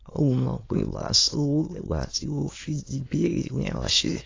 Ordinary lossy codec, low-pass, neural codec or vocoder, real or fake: AAC, 32 kbps; 7.2 kHz; autoencoder, 22.05 kHz, a latent of 192 numbers a frame, VITS, trained on many speakers; fake